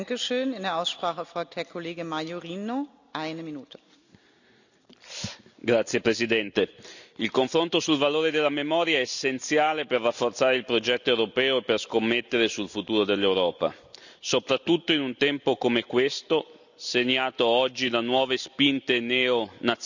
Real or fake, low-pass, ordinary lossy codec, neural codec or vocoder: real; 7.2 kHz; none; none